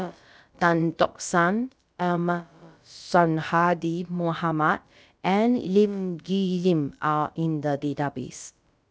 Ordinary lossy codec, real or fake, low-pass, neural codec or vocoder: none; fake; none; codec, 16 kHz, about 1 kbps, DyCAST, with the encoder's durations